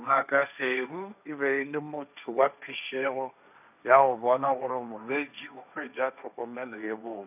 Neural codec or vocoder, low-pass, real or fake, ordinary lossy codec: codec, 16 kHz, 1.1 kbps, Voila-Tokenizer; 3.6 kHz; fake; none